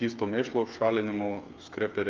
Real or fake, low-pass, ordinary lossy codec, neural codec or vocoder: fake; 7.2 kHz; Opus, 24 kbps; codec, 16 kHz, 8 kbps, FreqCodec, smaller model